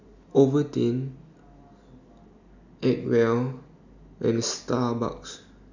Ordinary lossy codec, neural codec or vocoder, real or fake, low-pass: none; none; real; 7.2 kHz